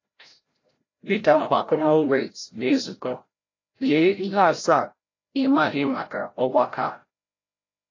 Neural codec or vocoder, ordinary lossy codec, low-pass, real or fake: codec, 16 kHz, 0.5 kbps, FreqCodec, larger model; AAC, 32 kbps; 7.2 kHz; fake